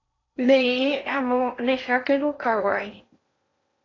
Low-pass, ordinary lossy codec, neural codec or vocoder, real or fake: 7.2 kHz; AAC, 32 kbps; codec, 16 kHz in and 24 kHz out, 0.8 kbps, FocalCodec, streaming, 65536 codes; fake